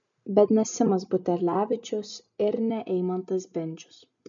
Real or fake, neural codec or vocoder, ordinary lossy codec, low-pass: real; none; MP3, 96 kbps; 7.2 kHz